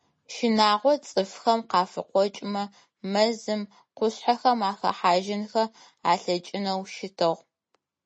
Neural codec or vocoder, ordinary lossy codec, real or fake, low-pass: none; MP3, 32 kbps; real; 10.8 kHz